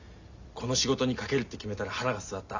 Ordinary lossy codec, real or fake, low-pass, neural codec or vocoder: Opus, 64 kbps; real; 7.2 kHz; none